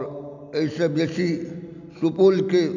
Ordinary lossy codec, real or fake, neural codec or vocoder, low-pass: none; real; none; 7.2 kHz